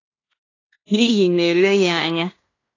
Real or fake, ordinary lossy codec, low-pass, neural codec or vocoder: fake; AAC, 32 kbps; 7.2 kHz; codec, 16 kHz in and 24 kHz out, 0.9 kbps, LongCat-Audio-Codec, fine tuned four codebook decoder